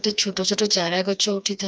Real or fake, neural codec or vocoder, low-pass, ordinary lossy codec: fake; codec, 16 kHz, 2 kbps, FreqCodec, smaller model; none; none